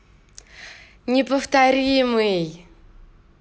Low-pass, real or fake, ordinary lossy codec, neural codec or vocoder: none; real; none; none